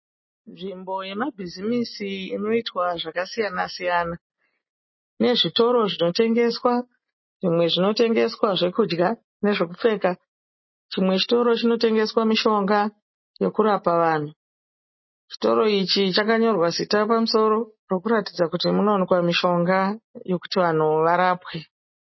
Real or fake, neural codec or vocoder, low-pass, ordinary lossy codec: real; none; 7.2 kHz; MP3, 24 kbps